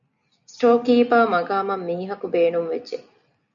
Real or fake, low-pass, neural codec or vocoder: real; 7.2 kHz; none